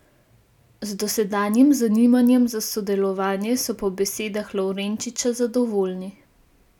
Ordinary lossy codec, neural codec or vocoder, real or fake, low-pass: none; none; real; 19.8 kHz